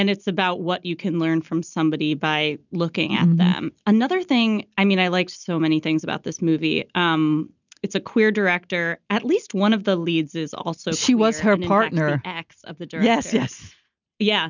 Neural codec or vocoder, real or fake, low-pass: none; real; 7.2 kHz